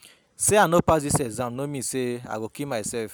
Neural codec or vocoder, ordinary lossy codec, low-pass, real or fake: none; none; none; real